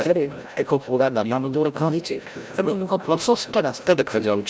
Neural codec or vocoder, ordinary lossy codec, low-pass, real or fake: codec, 16 kHz, 0.5 kbps, FreqCodec, larger model; none; none; fake